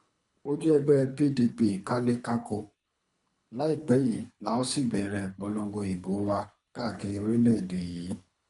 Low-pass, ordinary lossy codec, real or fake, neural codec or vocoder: 10.8 kHz; none; fake; codec, 24 kHz, 3 kbps, HILCodec